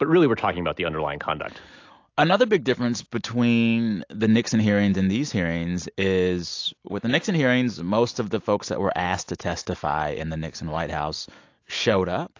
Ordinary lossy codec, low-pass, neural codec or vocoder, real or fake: AAC, 48 kbps; 7.2 kHz; none; real